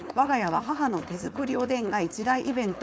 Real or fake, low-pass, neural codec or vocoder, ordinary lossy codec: fake; none; codec, 16 kHz, 4.8 kbps, FACodec; none